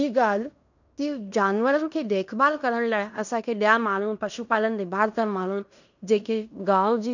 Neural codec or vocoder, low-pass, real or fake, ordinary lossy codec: codec, 16 kHz in and 24 kHz out, 0.9 kbps, LongCat-Audio-Codec, fine tuned four codebook decoder; 7.2 kHz; fake; none